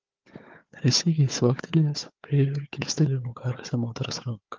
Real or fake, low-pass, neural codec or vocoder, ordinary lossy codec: fake; 7.2 kHz; codec, 16 kHz, 4 kbps, FunCodec, trained on Chinese and English, 50 frames a second; Opus, 32 kbps